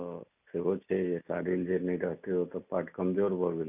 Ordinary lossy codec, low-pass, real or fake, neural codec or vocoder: none; 3.6 kHz; real; none